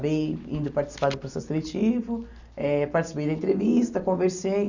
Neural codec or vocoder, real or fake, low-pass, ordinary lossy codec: none; real; 7.2 kHz; none